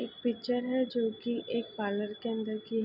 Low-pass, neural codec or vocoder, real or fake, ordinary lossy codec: 5.4 kHz; none; real; none